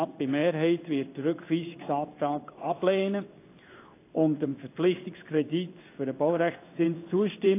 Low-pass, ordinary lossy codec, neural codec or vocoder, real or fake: 3.6 kHz; AAC, 24 kbps; codec, 16 kHz in and 24 kHz out, 1 kbps, XY-Tokenizer; fake